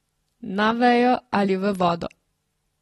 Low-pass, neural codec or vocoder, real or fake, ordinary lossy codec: 19.8 kHz; none; real; AAC, 32 kbps